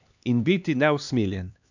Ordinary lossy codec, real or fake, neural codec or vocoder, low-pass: none; fake; codec, 16 kHz, 2 kbps, X-Codec, HuBERT features, trained on LibriSpeech; 7.2 kHz